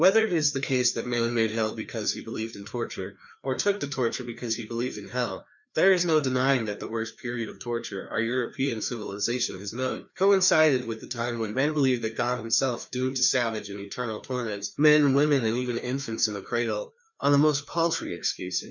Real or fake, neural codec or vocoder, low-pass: fake; codec, 16 kHz, 2 kbps, FreqCodec, larger model; 7.2 kHz